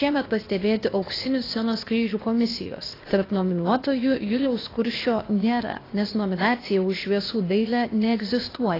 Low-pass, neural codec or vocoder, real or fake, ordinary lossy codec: 5.4 kHz; codec, 16 kHz, 0.8 kbps, ZipCodec; fake; AAC, 24 kbps